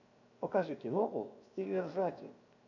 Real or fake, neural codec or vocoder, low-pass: fake; codec, 16 kHz, 0.7 kbps, FocalCodec; 7.2 kHz